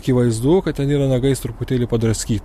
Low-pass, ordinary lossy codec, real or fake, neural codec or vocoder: 14.4 kHz; MP3, 64 kbps; real; none